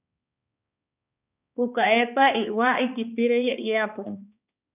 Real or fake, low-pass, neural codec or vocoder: fake; 3.6 kHz; codec, 16 kHz, 2 kbps, X-Codec, HuBERT features, trained on balanced general audio